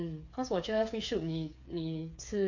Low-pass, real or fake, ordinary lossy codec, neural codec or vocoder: 7.2 kHz; fake; AAC, 48 kbps; codec, 16 kHz, 8 kbps, FreqCodec, smaller model